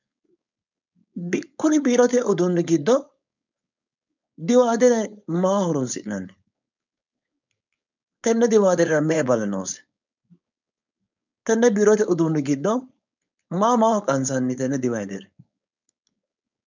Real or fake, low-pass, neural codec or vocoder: fake; 7.2 kHz; codec, 16 kHz, 4.8 kbps, FACodec